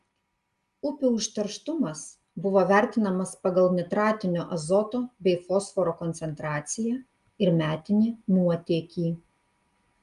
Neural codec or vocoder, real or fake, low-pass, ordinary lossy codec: none; real; 10.8 kHz; Opus, 32 kbps